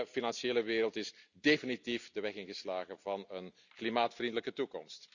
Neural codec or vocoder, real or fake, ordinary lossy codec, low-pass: none; real; none; 7.2 kHz